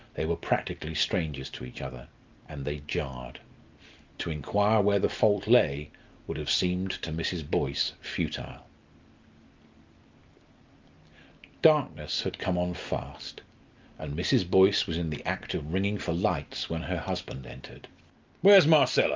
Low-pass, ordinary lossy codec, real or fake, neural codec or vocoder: 7.2 kHz; Opus, 32 kbps; real; none